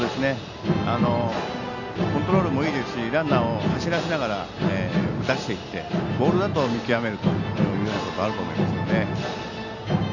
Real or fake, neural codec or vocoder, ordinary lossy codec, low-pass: real; none; none; 7.2 kHz